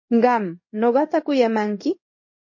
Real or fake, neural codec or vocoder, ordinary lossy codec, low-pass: fake; codec, 16 kHz in and 24 kHz out, 1 kbps, XY-Tokenizer; MP3, 32 kbps; 7.2 kHz